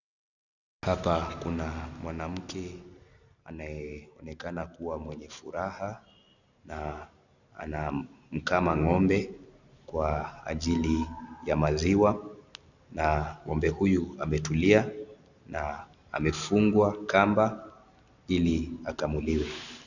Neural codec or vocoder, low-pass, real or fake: none; 7.2 kHz; real